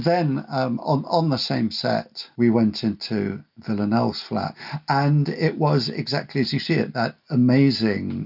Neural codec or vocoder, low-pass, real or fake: none; 5.4 kHz; real